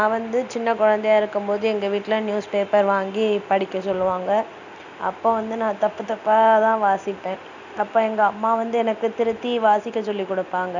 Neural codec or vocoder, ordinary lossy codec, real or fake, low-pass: none; none; real; 7.2 kHz